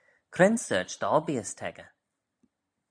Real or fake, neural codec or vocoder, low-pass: real; none; 9.9 kHz